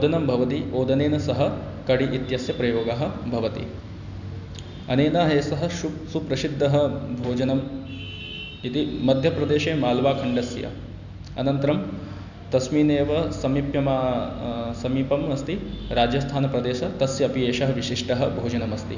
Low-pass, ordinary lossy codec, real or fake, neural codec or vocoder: 7.2 kHz; none; real; none